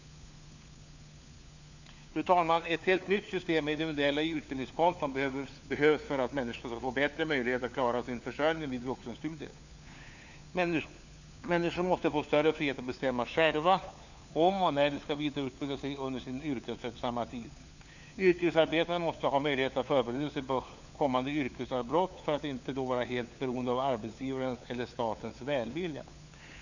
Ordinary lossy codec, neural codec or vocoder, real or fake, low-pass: none; codec, 16 kHz, 4 kbps, FunCodec, trained on LibriTTS, 50 frames a second; fake; 7.2 kHz